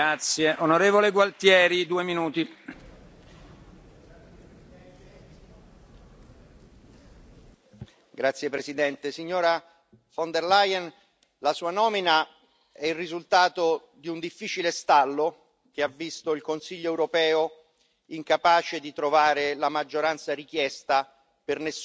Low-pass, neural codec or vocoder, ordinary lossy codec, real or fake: none; none; none; real